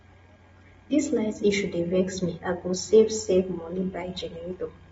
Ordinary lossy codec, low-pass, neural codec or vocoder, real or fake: AAC, 24 kbps; 19.8 kHz; none; real